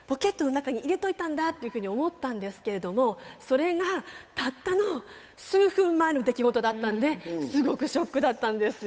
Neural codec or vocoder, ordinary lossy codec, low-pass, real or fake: codec, 16 kHz, 8 kbps, FunCodec, trained on Chinese and English, 25 frames a second; none; none; fake